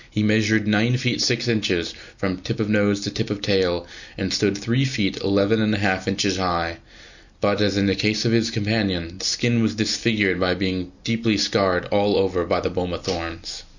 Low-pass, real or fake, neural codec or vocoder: 7.2 kHz; real; none